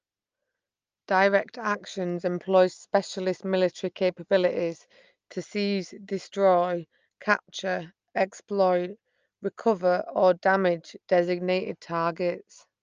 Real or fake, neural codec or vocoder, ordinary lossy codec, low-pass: real; none; Opus, 24 kbps; 7.2 kHz